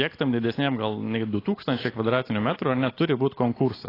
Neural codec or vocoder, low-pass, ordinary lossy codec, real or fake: none; 5.4 kHz; AAC, 24 kbps; real